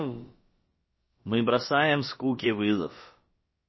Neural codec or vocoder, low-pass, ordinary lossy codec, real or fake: codec, 16 kHz, about 1 kbps, DyCAST, with the encoder's durations; 7.2 kHz; MP3, 24 kbps; fake